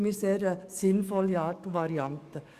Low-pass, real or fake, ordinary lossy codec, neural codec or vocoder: 14.4 kHz; fake; Opus, 64 kbps; codec, 44.1 kHz, 7.8 kbps, DAC